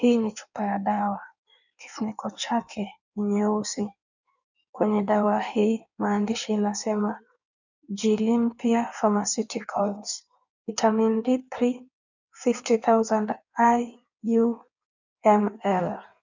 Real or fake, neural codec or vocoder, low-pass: fake; codec, 16 kHz in and 24 kHz out, 1.1 kbps, FireRedTTS-2 codec; 7.2 kHz